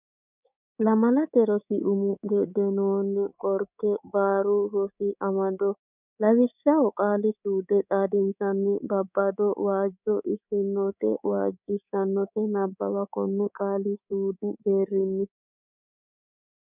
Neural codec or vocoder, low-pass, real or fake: codec, 24 kHz, 3.1 kbps, DualCodec; 3.6 kHz; fake